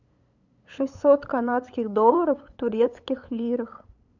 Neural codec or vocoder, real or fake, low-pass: codec, 16 kHz, 8 kbps, FunCodec, trained on LibriTTS, 25 frames a second; fake; 7.2 kHz